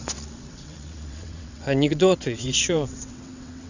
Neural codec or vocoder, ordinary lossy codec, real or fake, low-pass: vocoder, 22.05 kHz, 80 mel bands, WaveNeXt; none; fake; 7.2 kHz